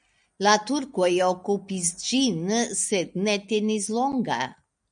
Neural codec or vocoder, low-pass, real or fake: none; 9.9 kHz; real